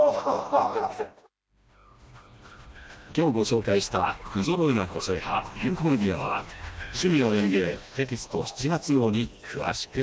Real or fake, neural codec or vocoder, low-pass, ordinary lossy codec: fake; codec, 16 kHz, 1 kbps, FreqCodec, smaller model; none; none